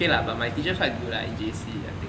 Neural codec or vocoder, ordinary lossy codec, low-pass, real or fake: none; none; none; real